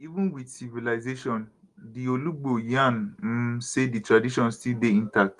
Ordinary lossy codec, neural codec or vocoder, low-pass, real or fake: Opus, 24 kbps; none; 10.8 kHz; real